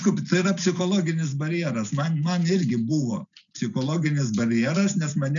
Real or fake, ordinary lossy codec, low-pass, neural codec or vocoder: real; MP3, 96 kbps; 7.2 kHz; none